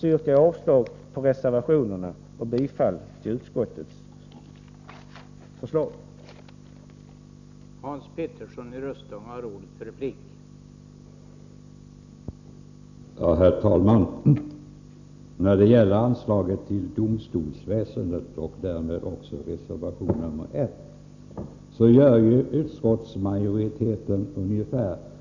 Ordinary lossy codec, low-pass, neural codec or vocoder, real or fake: none; 7.2 kHz; none; real